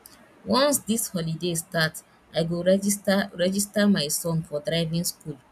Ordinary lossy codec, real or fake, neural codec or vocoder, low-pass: none; real; none; 14.4 kHz